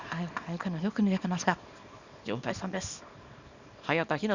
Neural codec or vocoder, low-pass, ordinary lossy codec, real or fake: codec, 24 kHz, 0.9 kbps, WavTokenizer, small release; 7.2 kHz; Opus, 64 kbps; fake